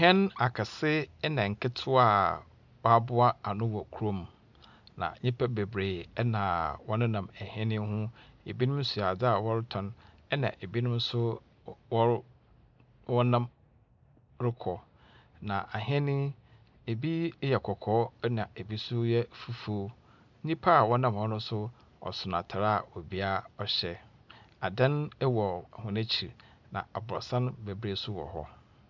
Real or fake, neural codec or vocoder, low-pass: real; none; 7.2 kHz